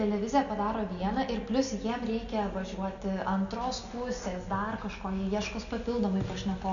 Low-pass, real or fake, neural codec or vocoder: 7.2 kHz; real; none